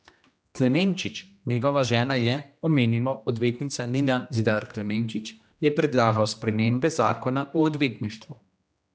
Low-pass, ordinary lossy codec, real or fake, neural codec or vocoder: none; none; fake; codec, 16 kHz, 1 kbps, X-Codec, HuBERT features, trained on general audio